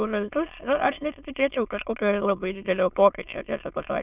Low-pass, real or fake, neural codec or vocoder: 3.6 kHz; fake; autoencoder, 22.05 kHz, a latent of 192 numbers a frame, VITS, trained on many speakers